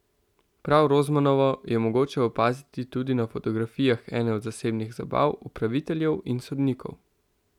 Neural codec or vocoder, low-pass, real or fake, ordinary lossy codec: none; 19.8 kHz; real; none